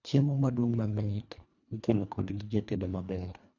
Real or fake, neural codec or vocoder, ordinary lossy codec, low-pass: fake; codec, 24 kHz, 1.5 kbps, HILCodec; none; 7.2 kHz